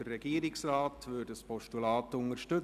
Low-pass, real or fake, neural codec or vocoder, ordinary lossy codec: 14.4 kHz; real; none; none